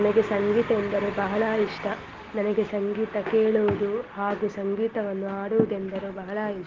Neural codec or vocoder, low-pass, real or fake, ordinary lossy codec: none; 7.2 kHz; real; Opus, 16 kbps